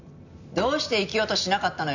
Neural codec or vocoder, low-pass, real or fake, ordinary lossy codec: none; 7.2 kHz; real; none